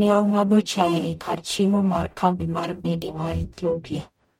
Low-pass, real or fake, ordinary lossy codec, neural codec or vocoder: 19.8 kHz; fake; MP3, 64 kbps; codec, 44.1 kHz, 0.9 kbps, DAC